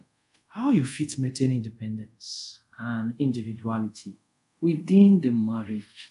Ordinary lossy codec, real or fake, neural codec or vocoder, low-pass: none; fake; codec, 24 kHz, 0.5 kbps, DualCodec; 10.8 kHz